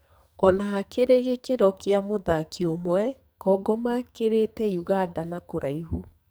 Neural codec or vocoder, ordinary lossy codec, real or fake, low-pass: codec, 44.1 kHz, 2.6 kbps, SNAC; none; fake; none